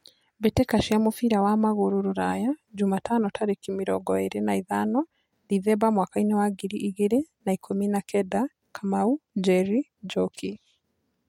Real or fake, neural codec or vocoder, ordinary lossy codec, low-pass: real; none; MP3, 64 kbps; 19.8 kHz